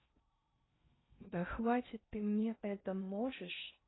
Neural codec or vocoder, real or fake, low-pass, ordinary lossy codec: codec, 16 kHz in and 24 kHz out, 0.6 kbps, FocalCodec, streaming, 4096 codes; fake; 7.2 kHz; AAC, 16 kbps